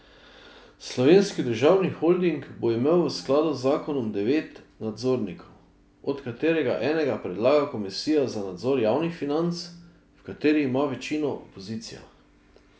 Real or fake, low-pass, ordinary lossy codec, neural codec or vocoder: real; none; none; none